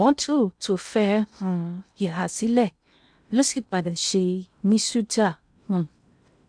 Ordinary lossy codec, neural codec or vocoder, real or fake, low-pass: none; codec, 16 kHz in and 24 kHz out, 0.6 kbps, FocalCodec, streaming, 2048 codes; fake; 9.9 kHz